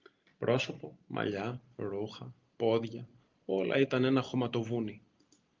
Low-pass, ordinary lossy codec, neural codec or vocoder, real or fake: 7.2 kHz; Opus, 32 kbps; none; real